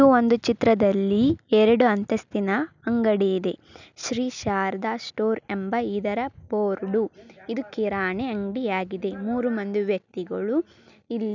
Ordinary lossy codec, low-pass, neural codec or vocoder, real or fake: none; 7.2 kHz; none; real